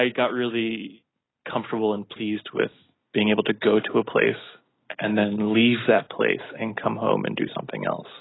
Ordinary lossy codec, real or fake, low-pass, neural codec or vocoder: AAC, 16 kbps; real; 7.2 kHz; none